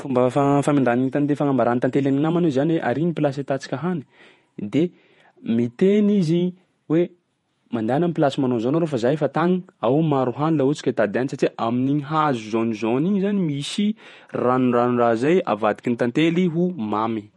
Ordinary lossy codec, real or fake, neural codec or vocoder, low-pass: MP3, 48 kbps; fake; vocoder, 48 kHz, 128 mel bands, Vocos; 19.8 kHz